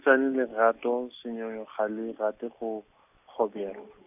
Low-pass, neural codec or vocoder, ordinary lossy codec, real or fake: 3.6 kHz; none; none; real